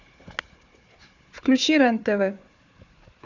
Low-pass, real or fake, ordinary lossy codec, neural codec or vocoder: 7.2 kHz; fake; AAC, 48 kbps; codec, 16 kHz, 4 kbps, FunCodec, trained on Chinese and English, 50 frames a second